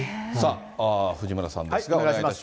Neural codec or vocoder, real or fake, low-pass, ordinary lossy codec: none; real; none; none